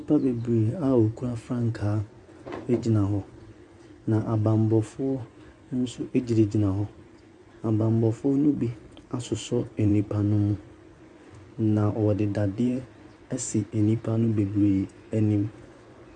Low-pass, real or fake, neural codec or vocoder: 10.8 kHz; fake; vocoder, 24 kHz, 100 mel bands, Vocos